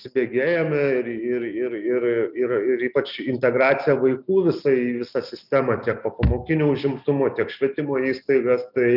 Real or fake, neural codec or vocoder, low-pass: real; none; 5.4 kHz